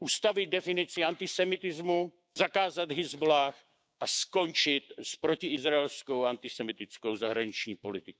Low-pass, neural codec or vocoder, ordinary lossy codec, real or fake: none; codec, 16 kHz, 6 kbps, DAC; none; fake